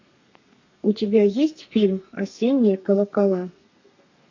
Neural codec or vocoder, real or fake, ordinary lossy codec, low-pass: codec, 32 kHz, 1.9 kbps, SNAC; fake; AAC, 48 kbps; 7.2 kHz